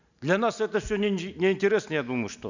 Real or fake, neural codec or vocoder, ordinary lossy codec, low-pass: real; none; none; 7.2 kHz